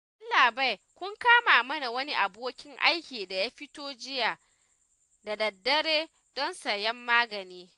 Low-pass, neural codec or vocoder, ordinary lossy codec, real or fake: 10.8 kHz; none; AAC, 64 kbps; real